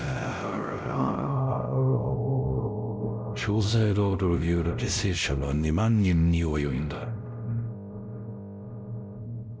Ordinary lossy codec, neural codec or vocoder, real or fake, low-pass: none; codec, 16 kHz, 0.5 kbps, X-Codec, WavLM features, trained on Multilingual LibriSpeech; fake; none